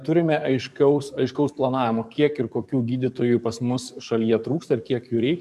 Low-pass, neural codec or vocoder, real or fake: 14.4 kHz; codec, 44.1 kHz, 7.8 kbps, DAC; fake